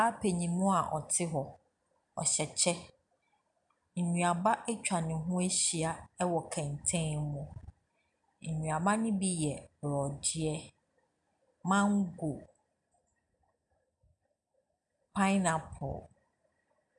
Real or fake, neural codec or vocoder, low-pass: real; none; 10.8 kHz